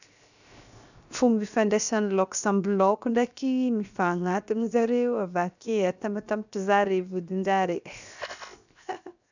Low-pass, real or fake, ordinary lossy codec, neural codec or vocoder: 7.2 kHz; fake; none; codec, 16 kHz, 0.7 kbps, FocalCodec